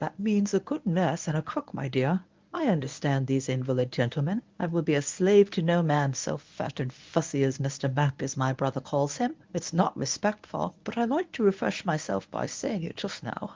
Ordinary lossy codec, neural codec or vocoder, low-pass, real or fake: Opus, 24 kbps; codec, 24 kHz, 0.9 kbps, WavTokenizer, medium speech release version 1; 7.2 kHz; fake